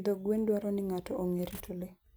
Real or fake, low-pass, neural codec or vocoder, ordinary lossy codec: real; none; none; none